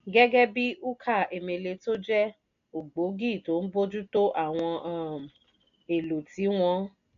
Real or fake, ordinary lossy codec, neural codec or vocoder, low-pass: real; MP3, 64 kbps; none; 7.2 kHz